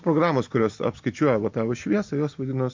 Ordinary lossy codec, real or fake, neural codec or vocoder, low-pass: MP3, 48 kbps; real; none; 7.2 kHz